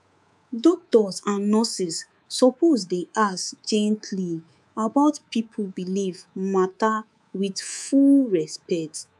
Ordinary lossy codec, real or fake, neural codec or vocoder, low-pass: none; fake; codec, 24 kHz, 3.1 kbps, DualCodec; none